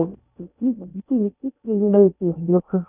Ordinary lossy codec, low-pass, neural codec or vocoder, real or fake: none; 3.6 kHz; codec, 16 kHz in and 24 kHz out, 0.6 kbps, FocalCodec, streaming, 2048 codes; fake